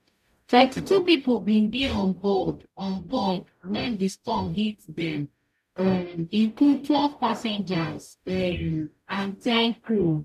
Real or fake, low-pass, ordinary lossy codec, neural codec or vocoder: fake; 14.4 kHz; none; codec, 44.1 kHz, 0.9 kbps, DAC